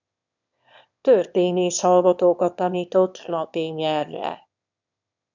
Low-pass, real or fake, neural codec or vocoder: 7.2 kHz; fake; autoencoder, 22.05 kHz, a latent of 192 numbers a frame, VITS, trained on one speaker